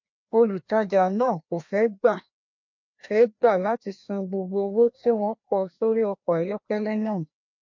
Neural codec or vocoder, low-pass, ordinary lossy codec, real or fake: codec, 16 kHz, 1 kbps, FreqCodec, larger model; 7.2 kHz; MP3, 48 kbps; fake